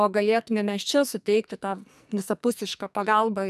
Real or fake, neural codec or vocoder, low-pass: fake; codec, 44.1 kHz, 2.6 kbps, SNAC; 14.4 kHz